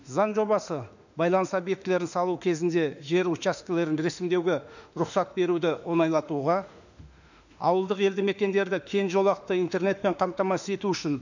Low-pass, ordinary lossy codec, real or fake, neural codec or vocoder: 7.2 kHz; none; fake; autoencoder, 48 kHz, 32 numbers a frame, DAC-VAE, trained on Japanese speech